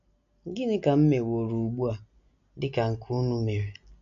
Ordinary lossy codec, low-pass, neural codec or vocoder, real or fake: none; 7.2 kHz; none; real